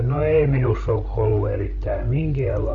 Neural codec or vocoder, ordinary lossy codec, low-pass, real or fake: codec, 16 kHz, 16 kbps, FreqCodec, larger model; none; 7.2 kHz; fake